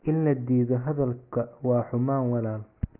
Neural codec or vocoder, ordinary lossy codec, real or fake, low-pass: none; Opus, 24 kbps; real; 3.6 kHz